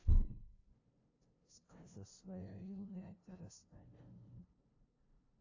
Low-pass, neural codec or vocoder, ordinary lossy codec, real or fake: 7.2 kHz; codec, 16 kHz, 0.5 kbps, FunCodec, trained on LibriTTS, 25 frames a second; AAC, 48 kbps; fake